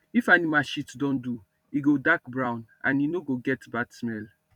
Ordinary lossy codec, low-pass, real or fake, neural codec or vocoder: none; 19.8 kHz; fake; vocoder, 44.1 kHz, 128 mel bands every 256 samples, BigVGAN v2